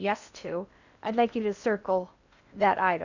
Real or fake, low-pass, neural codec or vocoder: fake; 7.2 kHz; codec, 16 kHz in and 24 kHz out, 0.8 kbps, FocalCodec, streaming, 65536 codes